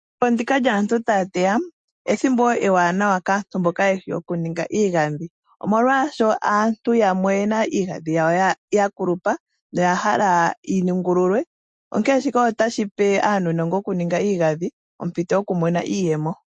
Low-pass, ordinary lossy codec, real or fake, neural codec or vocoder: 9.9 kHz; MP3, 48 kbps; real; none